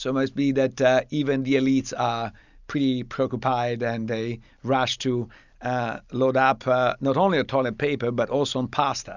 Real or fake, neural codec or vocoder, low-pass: real; none; 7.2 kHz